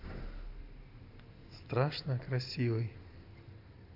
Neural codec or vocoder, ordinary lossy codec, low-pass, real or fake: none; AAC, 48 kbps; 5.4 kHz; real